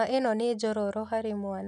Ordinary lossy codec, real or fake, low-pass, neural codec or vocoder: none; real; none; none